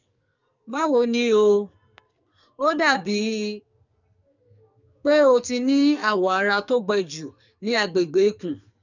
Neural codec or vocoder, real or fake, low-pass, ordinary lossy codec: codec, 44.1 kHz, 2.6 kbps, SNAC; fake; 7.2 kHz; none